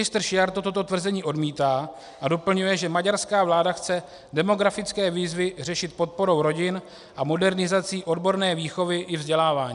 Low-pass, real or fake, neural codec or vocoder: 10.8 kHz; real; none